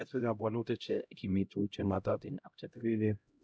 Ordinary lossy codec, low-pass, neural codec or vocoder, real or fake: none; none; codec, 16 kHz, 0.5 kbps, X-Codec, HuBERT features, trained on LibriSpeech; fake